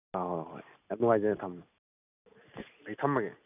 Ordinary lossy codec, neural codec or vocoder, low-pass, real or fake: none; none; 3.6 kHz; real